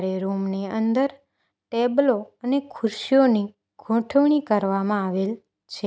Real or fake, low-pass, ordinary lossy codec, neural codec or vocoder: real; none; none; none